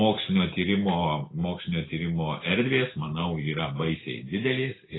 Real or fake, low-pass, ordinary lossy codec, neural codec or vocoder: real; 7.2 kHz; AAC, 16 kbps; none